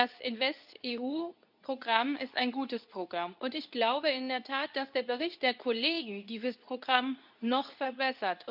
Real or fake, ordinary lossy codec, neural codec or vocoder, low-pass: fake; none; codec, 24 kHz, 0.9 kbps, WavTokenizer, medium speech release version 2; 5.4 kHz